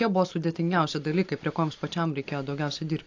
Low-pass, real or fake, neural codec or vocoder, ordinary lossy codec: 7.2 kHz; real; none; AAC, 48 kbps